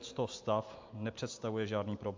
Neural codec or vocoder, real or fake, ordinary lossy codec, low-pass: none; real; MP3, 48 kbps; 7.2 kHz